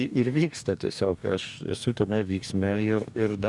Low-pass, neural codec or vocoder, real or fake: 10.8 kHz; codec, 44.1 kHz, 2.6 kbps, DAC; fake